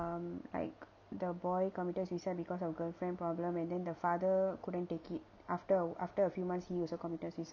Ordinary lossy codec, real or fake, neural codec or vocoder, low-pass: none; real; none; 7.2 kHz